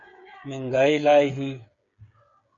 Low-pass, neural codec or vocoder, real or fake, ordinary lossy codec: 7.2 kHz; codec, 16 kHz, 8 kbps, FreqCodec, smaller model; fake; MP3, 96 kbps